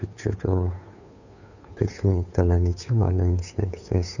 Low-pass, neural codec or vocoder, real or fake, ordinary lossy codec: 7.2 kHz; codec, 16 kHz, 2 kbps, FunCodec, trained on Chinese and English, 25 frames a second; fake; none